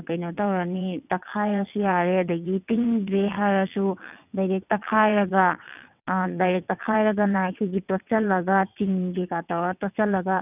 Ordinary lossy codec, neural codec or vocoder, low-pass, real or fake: none; vocoder, 22.05 kHz, 80 mel bands, WaveNeXt; 3.6 kHz; fake